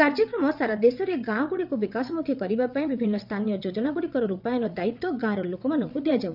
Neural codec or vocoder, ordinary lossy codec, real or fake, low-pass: vocoder, 22.05 kHz, 80 mel bands, WaveNeXt; none; fake; 5.4 kHz